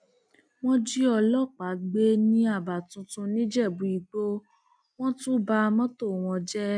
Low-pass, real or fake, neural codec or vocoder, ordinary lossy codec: 9.9 kHz; real; none; none